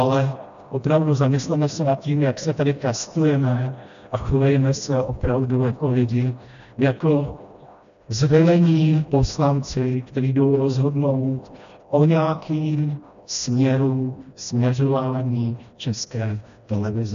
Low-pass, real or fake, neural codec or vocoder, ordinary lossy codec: 7.2 kHz; fake; codec, 16 kHz, 1 kbps, FreqCodec, smaller model; AAC, 96 kbps